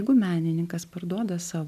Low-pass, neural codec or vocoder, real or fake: 14.4 kHz; none; real